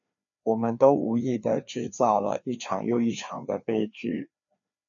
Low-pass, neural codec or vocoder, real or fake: 7.2 kHz; codec, 16 kHz, 2 kbps, FreqCodec, larger model; fake